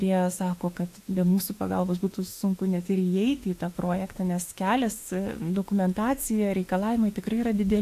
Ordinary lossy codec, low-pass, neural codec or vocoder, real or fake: AAC, 64 kbps; 14.4 kHz; autoencoder, 48 kHz, 32 numbers a frame, DAC-VAE, trained on Japanese speech; fake